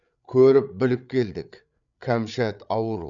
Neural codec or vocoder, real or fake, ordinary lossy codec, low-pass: codec, 16 kHz, 8 kbps, FreqCodec, larger model; fake; none; 7.2 kHz